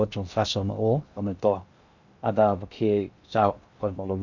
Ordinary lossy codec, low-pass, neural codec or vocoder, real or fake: none; 7.2 kHz; codec, 16 kHz in and 24 kHz out, 0.6 kbps, FocalCodec, streaming, 4096 codes; fake